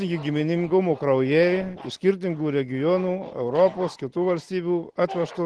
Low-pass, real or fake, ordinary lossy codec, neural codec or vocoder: 10.8 kHz; real; Opus, 16 kbps; none